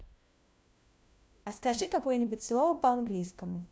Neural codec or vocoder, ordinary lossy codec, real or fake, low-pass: codec, 16 kHz, 1 kbps, FunCodec, trained on LibriTTS, 50 frames a second; none; fake; none